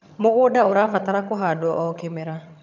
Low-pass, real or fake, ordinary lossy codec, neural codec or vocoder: 7.2 kHz; fake; none; vocoder, 22.05 kHz, 80 mel bands, HiFi-GAN